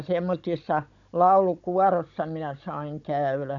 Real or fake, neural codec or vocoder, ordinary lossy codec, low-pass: fake; codec, 16 kHz, 16 kbps, FunCodec, trained on Chinese and English, 50 frames a second; none; 7.2 kHz